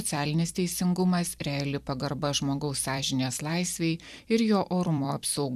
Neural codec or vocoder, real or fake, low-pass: vocoder, 44.1 kHz, 128 mel bands every 256 samples, BigVGAN v2; fake; 14.4 kHz